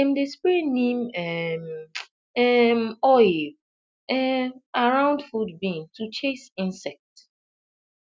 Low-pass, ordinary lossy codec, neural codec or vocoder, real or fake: none; none; none; real